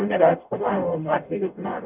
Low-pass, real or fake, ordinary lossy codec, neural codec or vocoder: 3.6 kHz; fake; none; codec, 44.1 kHz, 0.9 kbps, DAC